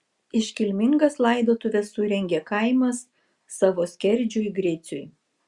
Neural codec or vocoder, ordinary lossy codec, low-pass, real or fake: none; Opus, 64 kbps; 10.8 kHz; real